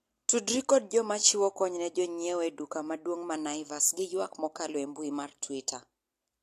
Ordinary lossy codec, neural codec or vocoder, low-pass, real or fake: AAC, 64 kbps; none; 14.4 kHz; real